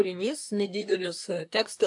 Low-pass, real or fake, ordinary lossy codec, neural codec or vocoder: 10.8 kHz; fake; AAC, 64 kbps; codec, 24 kHz, 1 kbps, SNAC